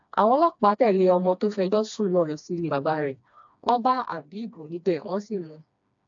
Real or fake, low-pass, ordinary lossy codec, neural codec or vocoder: fake; 7.2 kHz; AAC, 64 kbps; codec, 16 kHz, 2 kbps, FreqCodec, smaller model